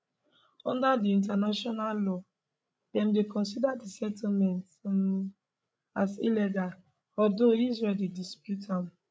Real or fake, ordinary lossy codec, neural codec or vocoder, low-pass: fake; none; codec, 16 kHz, 16 kbps, FreqCodec, larger model; none